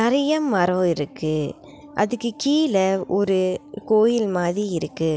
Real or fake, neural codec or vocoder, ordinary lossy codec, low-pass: real; none; none; none